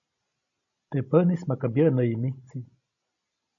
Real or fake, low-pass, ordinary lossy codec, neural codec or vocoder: real; 7.2 kHz; AAC, 48 kbps; none